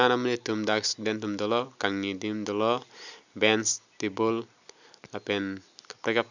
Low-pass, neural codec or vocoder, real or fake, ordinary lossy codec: 7.2 kHz; none; real; none